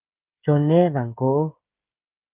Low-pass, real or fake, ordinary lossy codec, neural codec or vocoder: 3.6 kHz; fake; Opus, 32 kbps; codec, 16 kHz, 16 kbps, FreqCodec, smaller model